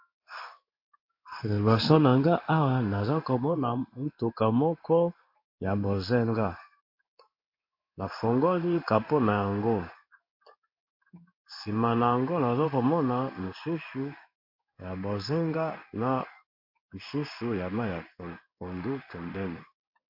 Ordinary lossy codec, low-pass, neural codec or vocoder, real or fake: MP3, 32 kbps; 5.4 kHz; codec, 16 kHz in and 24 kHz out, 1 kbps, XY-Tokenizer; fake